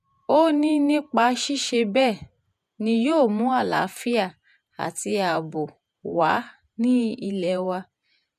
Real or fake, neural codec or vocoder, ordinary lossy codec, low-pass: fake; vocoder, 48 kHz, 128 mel bands, Vocos; none; 14.4 kHz